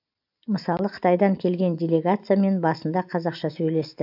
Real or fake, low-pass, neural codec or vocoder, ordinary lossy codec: real; 5.4 kHz; none; AAC, 48 kbps